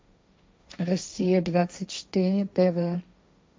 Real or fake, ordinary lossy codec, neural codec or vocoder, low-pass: fake; none; codec, 16 kHz, 1.1 kbps, Voila-Tokenizer; none